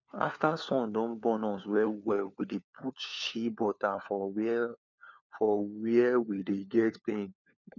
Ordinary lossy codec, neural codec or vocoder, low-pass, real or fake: none; codec, 16 kHz, 4 kbps, FunCodec, trained on LibriTTS, 50 frames a second; 7.2 kHz; fake